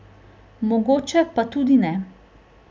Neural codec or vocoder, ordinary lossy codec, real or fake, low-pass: none; none; real; none